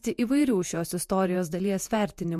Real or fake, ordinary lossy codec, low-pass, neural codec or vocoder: fake; MP3, 64 kbps; 14.4 kHz; vocoder, 48 kHz, 128 mel bands, Vocos